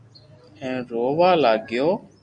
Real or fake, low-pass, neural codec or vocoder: real; 9.9 kHz; none